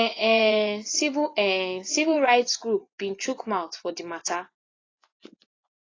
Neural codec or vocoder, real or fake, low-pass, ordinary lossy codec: vocoder, 24 kHz, 100 mel bands, Vocos; fake; 7.2 kHz; AAC, 32 kbps